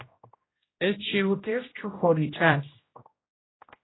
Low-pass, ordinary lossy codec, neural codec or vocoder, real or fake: 7.2 kHz; AAC, 16 kbps; codec, 16 kHz, 0.5 kbps, X-Codec, HuBERT features, trained on general audio; fake